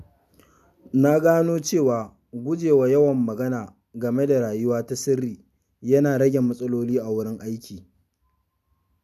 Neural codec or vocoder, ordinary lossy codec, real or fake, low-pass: none; none; real; 14.4 kHz